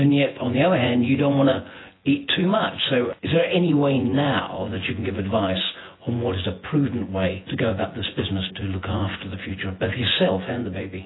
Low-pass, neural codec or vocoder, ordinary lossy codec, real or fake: 7.2 kHz; vocoder, 24 kHz, 100 mel bands, Vocos; AAC, 16 kbps; fake